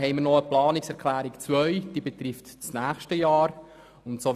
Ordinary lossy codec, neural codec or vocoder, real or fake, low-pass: none; none; real; 14.4 kHz